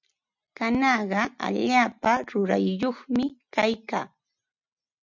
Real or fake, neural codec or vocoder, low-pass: real; none; 7.2 kHz